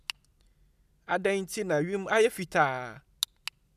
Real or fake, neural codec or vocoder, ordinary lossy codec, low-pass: real; none; none; 14.4 kHz